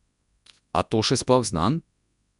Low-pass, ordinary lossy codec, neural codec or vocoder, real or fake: 10.8 kHz; none; codec, 24 kHz, 0.9 kbps, WavTokenizer, large speech release; fake